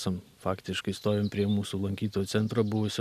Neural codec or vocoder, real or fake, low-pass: none; real; 14.4 kHz